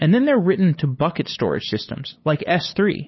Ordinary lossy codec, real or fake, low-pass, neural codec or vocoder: MP3, 24 kbps; fake; 7.2 kHz; codec, 16 kHz, 8 kbps, FunCodec, trained on LibriTTS, 25 frames a second